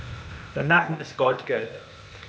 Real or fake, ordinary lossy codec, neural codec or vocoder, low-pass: fake; none; codec, 16 kHz, 0.8 kbps, ZipCodec; none